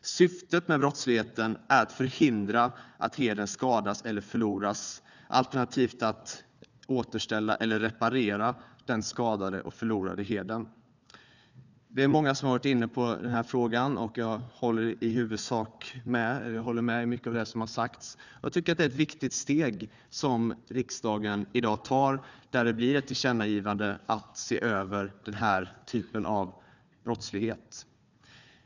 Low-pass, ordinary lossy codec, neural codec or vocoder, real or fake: 7.2 kHz; none; codec, 16 kHz, 4 kbps, FunCodec, trained on Chinese and English, 50 frames a second; fake